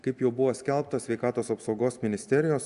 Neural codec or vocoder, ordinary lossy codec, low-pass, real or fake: none; MP3, 96 kbps; 10.8 kHz; real